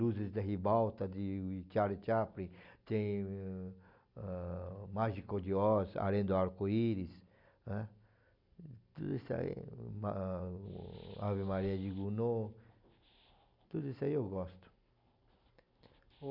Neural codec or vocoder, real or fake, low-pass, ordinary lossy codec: none; real; 5.4 kHz; MP3, 48 kbps